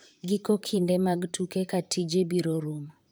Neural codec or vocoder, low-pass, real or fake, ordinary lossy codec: vocoder, 44.1 kHz, 128 mel bands, Pupu-Vocoder; none; fake; none